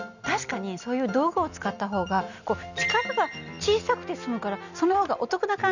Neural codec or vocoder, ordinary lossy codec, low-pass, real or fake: none; none; 7.2 kHz; real